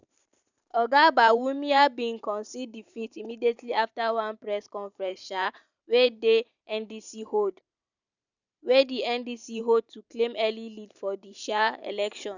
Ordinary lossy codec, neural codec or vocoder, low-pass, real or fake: none; vocoder, 44.1 kHz, 128 mel bands every 512 samples, BigVGAN v2; 7.2 kHz; fake